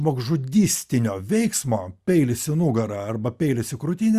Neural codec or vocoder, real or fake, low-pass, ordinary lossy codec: none; real; 14.4 kHz; Opus, 64 kbps